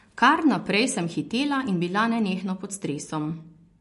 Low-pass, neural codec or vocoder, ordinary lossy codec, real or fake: 14.4 kHz; none; MP3, 48 kbps; real